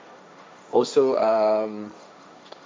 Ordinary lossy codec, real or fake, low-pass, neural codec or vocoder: none; fake; none; codec, 16 kHz, 1.1 kbps, Voila-Tokenizer